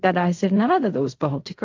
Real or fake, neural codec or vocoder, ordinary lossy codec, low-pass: fake; codec, 16 kHz in and 24 kHz out, 0.4 kbps, LongCat-Audio-Codec, fine tuned four codebook decoder; AAC, 48 kbps; 7.2 kHz